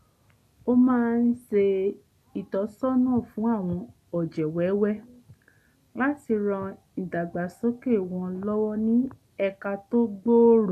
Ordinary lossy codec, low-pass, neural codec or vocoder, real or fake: none; 14.4 kHz; none; real